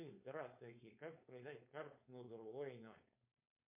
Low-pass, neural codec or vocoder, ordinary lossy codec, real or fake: 3.6 kHz; codec, 16 kHz, 4.8 kbps, FACodec; MP3, 24 kbps; fake